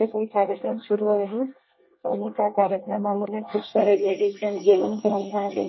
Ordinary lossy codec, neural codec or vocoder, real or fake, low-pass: MP3, 24 kbps; codec, 24 kHz, 1 kbps, SNAC; fake; 7.2 kHz